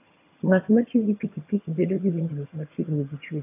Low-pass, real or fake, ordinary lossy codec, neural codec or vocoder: 3.6 kHz; fake; AAC, 24 kbps; vocoder, 22.05 kHz, 80 mel bands, HiFi-GAN